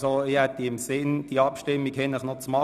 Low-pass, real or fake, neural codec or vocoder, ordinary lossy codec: 14.4 kHz; real; none; none